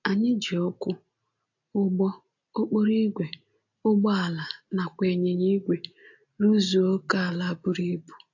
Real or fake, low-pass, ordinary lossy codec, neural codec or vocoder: real; 7.2 kHz; AAC, 48 kbps; none